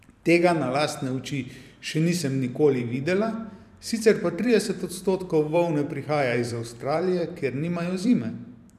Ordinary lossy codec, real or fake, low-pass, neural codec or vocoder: none; fake; 14.4 kHz; vocoder, 44.1 kHz, 128 mel bands every 512 samples, BigVGAN v2